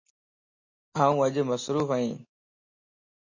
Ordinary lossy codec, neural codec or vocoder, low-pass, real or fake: MP3, 32 kbps; none; 7.2 kHz; real